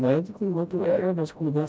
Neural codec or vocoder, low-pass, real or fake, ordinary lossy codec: codec, 16 kHz, 0.5 kbps, FreqCodec, smaller model; none; fake; none